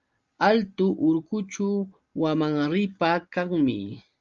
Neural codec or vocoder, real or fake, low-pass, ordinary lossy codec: none; real; 7.2 kHz; Opus, 32 kbps